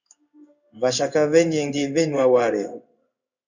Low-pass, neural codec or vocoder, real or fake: 7.2 kHz; codec, 16 kHz in and 24 kHz out, 1 kbps, XY-Tokenizer; fake